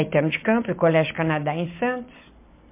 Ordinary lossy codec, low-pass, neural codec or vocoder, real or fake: MP3, 24 kbps; 3.6 kHz; none; real